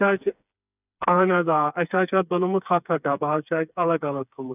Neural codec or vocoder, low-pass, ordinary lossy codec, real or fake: codec, 16 kHz, 4 kbps, FreqCodec, smaller model; 3.6 kHz; none; fake